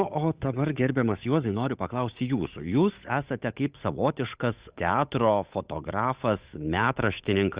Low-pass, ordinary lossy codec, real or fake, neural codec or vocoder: 3.6 kHz; Opus, 64 kbps; fake; codec, 16 kHz, 8 kbps, FunCodec, trained on Chinese and English, 25 frames a second